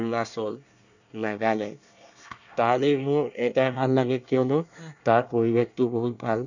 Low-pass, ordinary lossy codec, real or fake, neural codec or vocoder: 7.2 kHz; none; fake; codec, 24 kHz, 1 kbps, SNAC